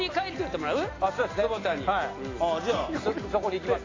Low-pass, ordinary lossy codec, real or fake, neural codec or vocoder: 7.2 kHz; AAC, 32 kbps; real; none